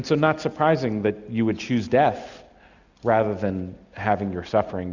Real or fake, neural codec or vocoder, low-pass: real; none; 7.2 kHz